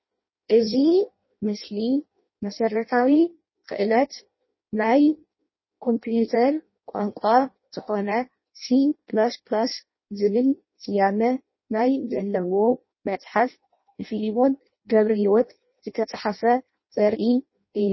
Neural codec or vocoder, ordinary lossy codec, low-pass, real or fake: codec, 16 kHz in and 24 kHz out, 0.6 kbps, FireRedTTS-2 codec; MP3, 24 kbps; 7.2 kHz; fake